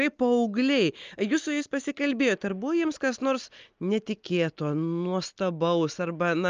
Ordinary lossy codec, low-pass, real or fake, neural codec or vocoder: Opus, 24 kbps; 7.2 kHz; real; none